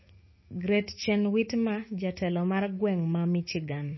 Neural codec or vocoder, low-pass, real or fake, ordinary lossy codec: autoencoder, 48 kHz, 128 numbers a frame, DAC-VAE, trained on Japanese speech; 7.2 kHz; fake; MP3, 24 kbps